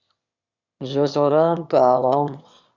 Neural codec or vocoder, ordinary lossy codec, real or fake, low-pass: autoencoder, 22.05 kHz, a latent of 192 numbers a frame, VITS, trained on one speaker; Opus, 64 kbps; fake; 7.2 kHz